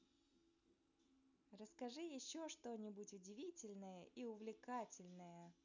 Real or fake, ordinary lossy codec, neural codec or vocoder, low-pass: real; none; none; 7.2 kHz